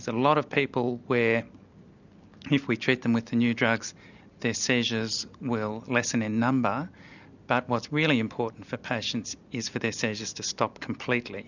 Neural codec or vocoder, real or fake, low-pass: none; real; 7.2 kHz